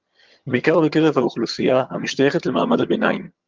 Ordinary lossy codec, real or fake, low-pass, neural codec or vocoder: Opus, 32 kbps; fake; 7.2 kHz; vocoder, 22.05 kHz, 80 mel bands, HiFi-GAN